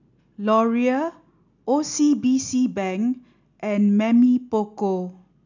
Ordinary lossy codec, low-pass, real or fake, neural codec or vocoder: none; 7.2 kHz; real; none